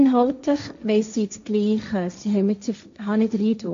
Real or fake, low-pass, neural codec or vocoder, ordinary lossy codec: fake; 7.2 kHz; codec, 16 kHz, 1.1 kbps, Voila-Tokenizer; AAC, 64 kbps